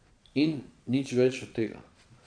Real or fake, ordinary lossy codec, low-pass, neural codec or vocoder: fake; MP3, 64 kbps; 9.9 kHz; vocoder, 22.05 kHz, 80 mel bands, Vocos